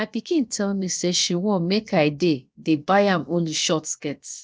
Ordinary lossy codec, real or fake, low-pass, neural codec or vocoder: none; fake; none; codec, 16 kHz, 0.7 kbps, FocalCodec